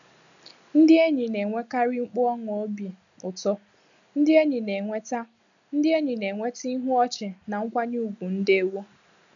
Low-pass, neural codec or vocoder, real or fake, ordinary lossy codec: 7.2 kHz; none; real; none